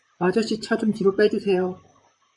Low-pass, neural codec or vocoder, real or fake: 10.8 kHz; vocoder, 44.1 kHz, 128 mel bands, Pupu-Vocoder; fake